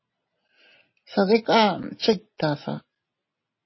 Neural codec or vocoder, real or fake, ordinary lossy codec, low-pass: none; real; MP3, 24 kbps; 7.2 kHz